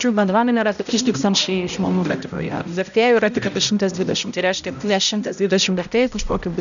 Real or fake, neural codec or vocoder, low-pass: fake; codec, 16 kHz, 0.5 kbps, X-Codec, HuBERT features, trained on balanced general audio; 7.2 kHz